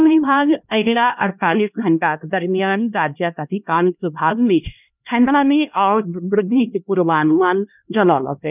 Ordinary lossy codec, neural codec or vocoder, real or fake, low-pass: none; codec, 16 kHz, 1 kbps, X-Codec, HuBERT features, trained on LibriSpeech; fake; 3.6 kHz